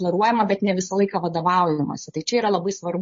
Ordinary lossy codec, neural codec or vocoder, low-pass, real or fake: MP3, 32 kbps; codec, 16 kHz, 8 kbps, FunCodec, trained on Chinese and English, 25 frames a second; 7.2 kHz; fake